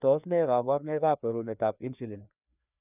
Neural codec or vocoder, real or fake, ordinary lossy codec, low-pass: codec, 16 kHz, 2 kbps, FreqCodec, larger model; fake; none; 3.6 kHz